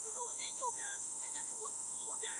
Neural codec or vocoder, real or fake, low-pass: autoencoder, 48 kHz, 32 numbers a frame, DAC-VAE, trained on Japanese speech; fake; 10.8 kHz